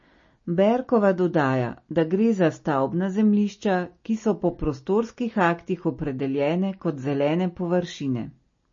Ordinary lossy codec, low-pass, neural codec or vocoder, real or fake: MP3, 32 kbps; 7.2 kHz; none; real